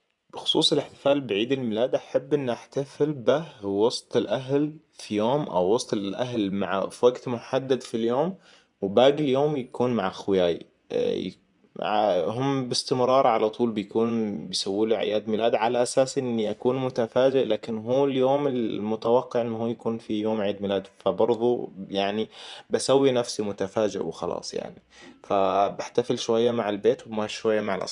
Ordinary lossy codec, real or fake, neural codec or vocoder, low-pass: none; fake; vocoder, 24 kHz, 100 mel bands, Vocos; 10.8 kHz